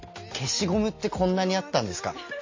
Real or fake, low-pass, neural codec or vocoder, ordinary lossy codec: real; 7.2 kHz; none; MP3, 32 kbps